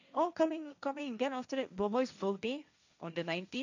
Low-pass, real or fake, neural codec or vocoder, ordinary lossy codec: 7.2 kHz; fake; codec, 16 kHz, 1.1 kbps, Voila-Tokenizer; none